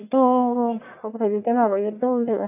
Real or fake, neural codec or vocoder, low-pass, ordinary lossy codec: fake; codec, 44.1 kHz, 1.7 kbps, Pupu-Codec; 3.6 kHz; none